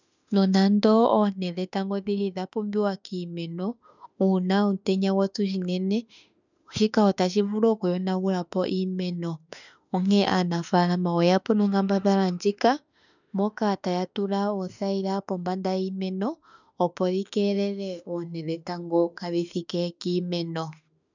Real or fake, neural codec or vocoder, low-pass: fake; autoencoder, 48 kHz, 32 numbers a frame, DAC-VAE, trained on Japanese speech; 7.2 kHz